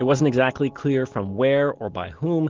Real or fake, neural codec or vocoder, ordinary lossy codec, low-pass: real; none; Opus, 16 kbps; 7.2 kHz